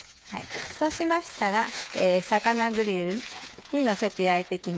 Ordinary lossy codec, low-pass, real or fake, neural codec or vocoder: none; none; fake; codec, 16 kHz, 4 kbps, FreqCodec, smaller model